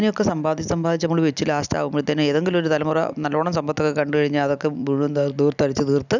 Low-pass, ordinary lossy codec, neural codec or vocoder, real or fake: 7.2 kHz; none; none; real